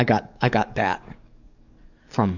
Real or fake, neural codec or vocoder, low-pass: fake; codec, 44.1 kHz, 7.8 kbps, DAC; 7.2 kHz